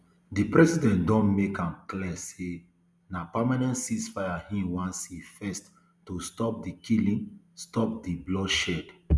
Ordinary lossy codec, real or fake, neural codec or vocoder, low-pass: none; real; none; none